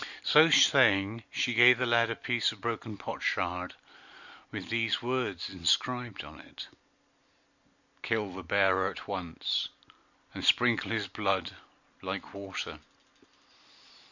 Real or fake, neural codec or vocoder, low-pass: real; none; 7.2 kHz